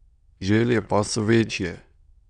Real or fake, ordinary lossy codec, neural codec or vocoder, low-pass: fake; MP3, 64 kbps; autoencoder, 22.05 kHz, a latent of 192 numbers a frame, VITS, trained on many speakers; 9.9 kHz